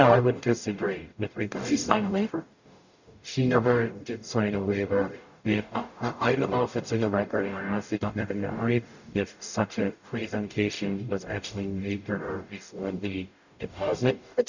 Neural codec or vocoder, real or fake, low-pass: codec, 44.1 kHz, 0.9 kbps, DAC; fake; 7.2 kHz